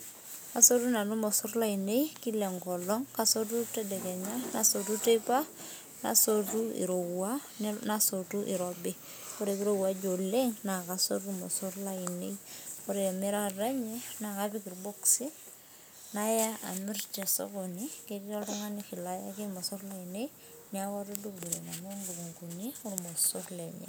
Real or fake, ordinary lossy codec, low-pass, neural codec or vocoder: real; none; none; none